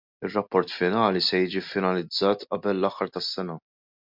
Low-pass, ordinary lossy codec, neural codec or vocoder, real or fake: 5.4 kHz; MP3, 48 kbps; none; real